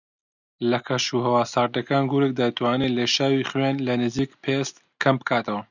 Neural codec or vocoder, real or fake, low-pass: none; real; 7.2 kHz